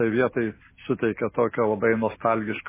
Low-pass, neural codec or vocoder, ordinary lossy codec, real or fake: 3.6 kHz; none; MP3, 16 kbps; real